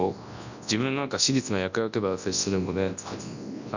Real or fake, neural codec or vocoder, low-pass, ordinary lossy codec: fake; codec, 24 kHz, 0.9 kbps, WavTokenizer, large speech release; 7.2 kHz; none